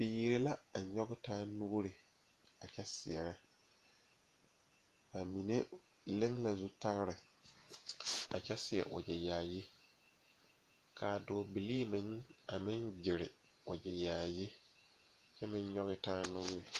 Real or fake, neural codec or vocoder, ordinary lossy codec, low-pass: fake; vocoder, 48 kHz, 128 mel bands, Vocos; Opus, 32 kbps; 14.4 kHz